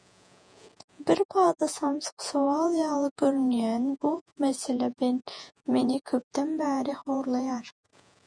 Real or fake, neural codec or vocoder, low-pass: fake; vocoder, 48 kHz, 128 mel bands, Vocos; 9.9 kHz